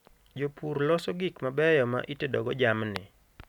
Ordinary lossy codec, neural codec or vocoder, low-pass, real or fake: none; none; 19.8 kHz; real